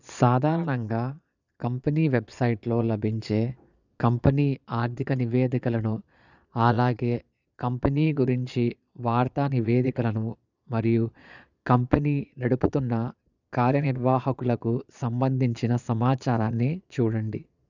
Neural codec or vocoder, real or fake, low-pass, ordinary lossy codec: vocoder, 22.05 kHz, 80 mel bands, Vocos; fake; 7.2 kHz; none